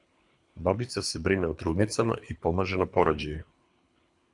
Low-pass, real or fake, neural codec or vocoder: 10.8 kHz; fake; codec, 24 kHz, 3 kbps, HILCodec